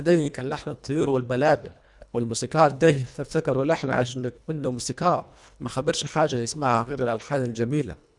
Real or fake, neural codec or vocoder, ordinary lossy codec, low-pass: fake; codec, 24 kHz, 1.5 kbps, HILCodec; none; 10.8 kHz